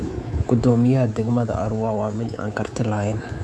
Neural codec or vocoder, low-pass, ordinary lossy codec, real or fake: codec, 24 kHz, 3.1 kbps, DualCodec; none; none; fake